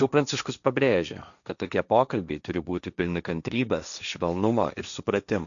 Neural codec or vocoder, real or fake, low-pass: codec, 16 kHz, 1.1 kbps, Voila-Tokenizer; fake; 7.2 kHz